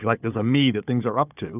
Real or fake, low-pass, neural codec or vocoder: fake; 3.6 kHz; codec, 16 kHz, 4 kbps, FunCodec, trained on Chinese and English, 50 frames a second